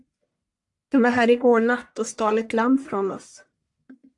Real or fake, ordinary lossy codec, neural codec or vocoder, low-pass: fake; AAC, 64 kbps; codec, 44.1 kHz, 1.7 kbps, Pupu-Codec; 10.8 kHz